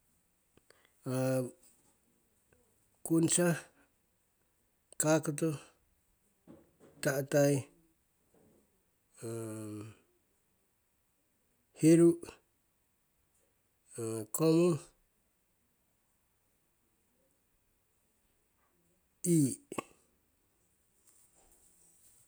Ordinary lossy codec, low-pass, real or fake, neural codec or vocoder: none; none; real; none